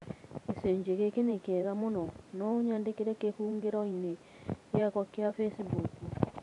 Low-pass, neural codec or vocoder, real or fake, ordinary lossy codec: 10.8 kHz; vocoder, 44.1 kHz, 128 mel bands every 512 samples, BigVGAN v2; fake; none